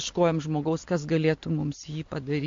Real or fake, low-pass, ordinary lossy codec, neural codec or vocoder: real; 7.2 kHz; MP3, 48 kbps; none